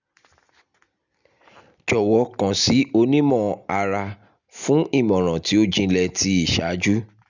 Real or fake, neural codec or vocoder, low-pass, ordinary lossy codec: real; none; 7.2 kHz; none